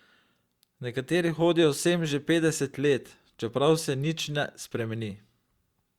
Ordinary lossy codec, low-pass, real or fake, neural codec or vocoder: Opus, 64 kbps; 19.8 kHz; fake; vocoder, 44.1 kHz, 128 mel bands every 512 samples, BigVGAN v2